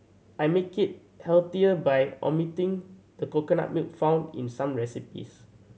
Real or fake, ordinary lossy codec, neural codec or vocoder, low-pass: real; none; none; none